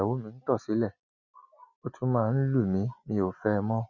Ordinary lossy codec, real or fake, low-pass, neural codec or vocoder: none; real; 7.2 kHz; none